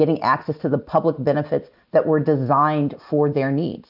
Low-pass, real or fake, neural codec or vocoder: 5.4 kHz; real; none